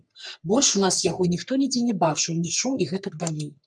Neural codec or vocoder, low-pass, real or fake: codec, 44.1 kHz, 3.4 kbps, Pupu-Codec; 9.9 kHz; fake